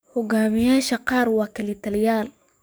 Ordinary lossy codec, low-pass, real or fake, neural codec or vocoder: none; none; fake; codec, 44.1 kHz, 7.8 kbps, DAC